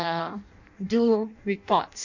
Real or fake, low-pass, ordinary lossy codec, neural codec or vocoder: fake; 7.2 kHz; none; codec, 16 kHz in and 24 kHz out, 0.6 kbps, FireRedTTS-2 codec